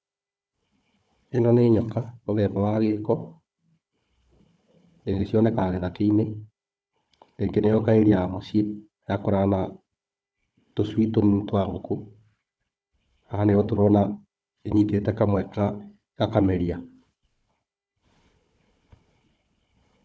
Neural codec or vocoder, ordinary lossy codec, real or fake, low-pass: codec, 16 kHz, 4 kbps, FunCodec, trained on Chinese and English, 50 frames a second; none; fake; none